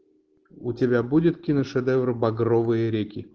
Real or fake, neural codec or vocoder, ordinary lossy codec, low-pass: real; none; Opus, 16 kbps; 7.2 kHz